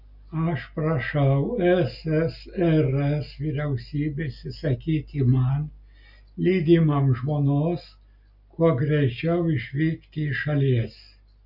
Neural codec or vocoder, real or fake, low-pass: none; real; 5.4 kHz